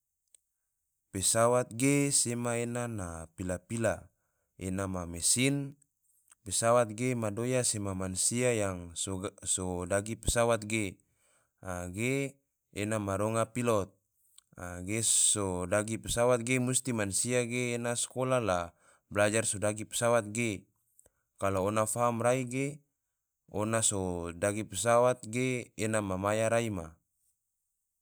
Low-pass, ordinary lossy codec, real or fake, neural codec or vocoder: none; none; real; none